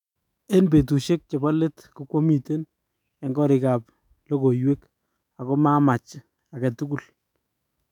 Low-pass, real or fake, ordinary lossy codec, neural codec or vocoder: 19.8 kHz; fake; none; autoencoder, 48 kHz, 128 numbers a frame, DAC-VAE, trained on Japanese speech